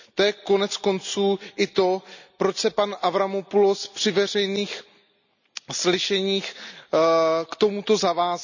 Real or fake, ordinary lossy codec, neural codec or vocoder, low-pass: real; none; none; 7.2 kHz